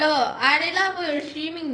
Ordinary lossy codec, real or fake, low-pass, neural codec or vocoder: none; fake; 9.9 kHz; vocoder, 22.05 kHz, 80 mel bands, WaveNeXt